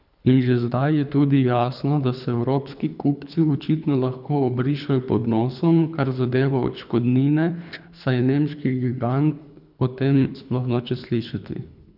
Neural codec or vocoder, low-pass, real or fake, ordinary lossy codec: codec, 24 kHz, 3 kbps, HILCodec; 5.4 kHz; fake; none